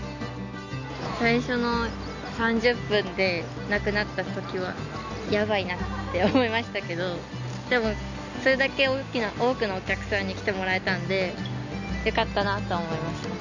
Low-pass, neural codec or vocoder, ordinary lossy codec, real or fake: 7.2 kHz; none; none; real